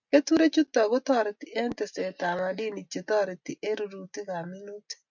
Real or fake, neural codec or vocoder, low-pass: real; none; 7.2 kHz